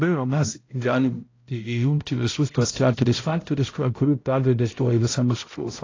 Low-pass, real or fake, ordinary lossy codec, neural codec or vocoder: 7.2 kHz; fake; AAC, 32 kbps; codec, 16 kHz, 0.5 kbps, X-Codec, HuBERT features, trained on balanced general audio